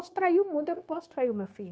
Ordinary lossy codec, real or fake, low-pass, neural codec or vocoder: none; fake; none; codec, 16 kHz, 0.9 kbps, LongCat-Audio-Codec